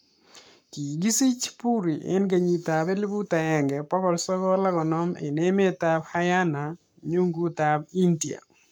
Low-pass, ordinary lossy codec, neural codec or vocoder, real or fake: 19.8 kHz; none; codec, 44.1 kHz, 7.8 kbps, Pupu-Codec; fake